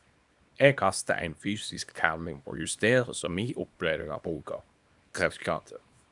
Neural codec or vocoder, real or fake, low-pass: codec, 24 kHz, 0.9 kbps, WavTokenizer, small release; fake; 10.8 kHz